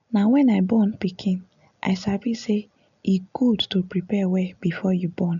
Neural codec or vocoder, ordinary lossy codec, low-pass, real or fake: none; none; 7.2 kHz; real